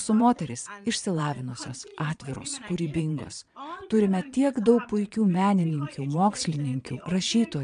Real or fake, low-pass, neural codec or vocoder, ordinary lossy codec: fake; 9.9 kHz; vocoder, 22.05 kHz, 80 mel bands, Vocos; AAC, 96 kbps